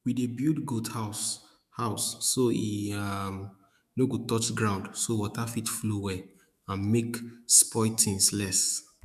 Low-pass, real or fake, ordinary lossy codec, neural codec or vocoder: 14.4 kHz; fake; none; autoencoder, 48 kHz, 128 numbers a frame, DAC-VAE, trained on Japanese speech